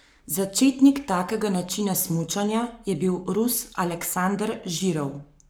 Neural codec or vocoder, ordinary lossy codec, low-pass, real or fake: codec, 44.1 kHz, 7.8 kbps, Pupu-Codec; none; none; fake